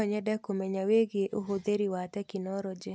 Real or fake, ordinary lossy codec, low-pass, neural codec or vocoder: real; none; none; none